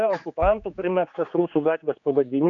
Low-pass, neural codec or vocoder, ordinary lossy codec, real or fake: 7.2 kHz; codec, 16 kHz, 4 kbps, X-Codec, HuBERT features, trained on general audio; AAC, 48 kbps; fake